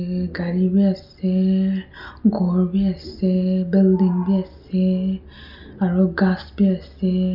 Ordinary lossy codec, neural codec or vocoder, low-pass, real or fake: none; none; 5.4 kHz; real